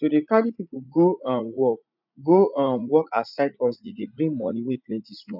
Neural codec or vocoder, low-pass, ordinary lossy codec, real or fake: vocoder, 44.1 kHz, 80 mel bands, Vocos; 5.4 kHz; none; fake